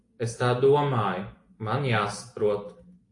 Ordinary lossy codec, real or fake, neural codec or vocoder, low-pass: AAC, 48 kbps; real; none; 10.8 kHz